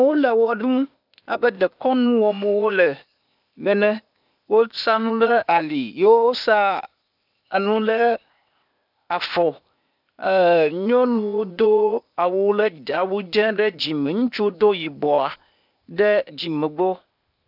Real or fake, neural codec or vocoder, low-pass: fake; codec, 16 kHz, 0.8 kbps, ZipCodec; 5.4 kHz